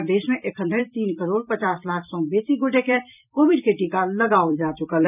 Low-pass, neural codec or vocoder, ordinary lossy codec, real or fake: 3.6 kHz; vocoder, 44.1 kHz, 128 mel bands every 256 samples, BigVGAN v2; none; fake